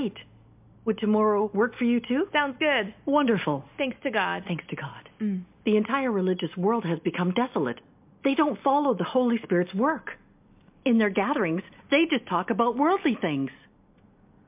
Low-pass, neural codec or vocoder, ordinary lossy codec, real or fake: 3.6 kHz; none; MP3, 32 kbps; real